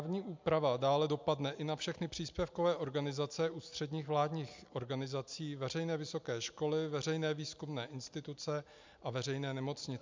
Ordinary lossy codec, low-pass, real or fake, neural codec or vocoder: MP3, 64 kbps; 7.2 kHz; real; none